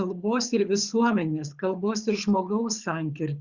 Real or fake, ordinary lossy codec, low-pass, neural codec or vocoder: fake; Opus, 64 kbps; 7.2 kHz; codec, 24 kHz, 6 kbps, HILCodec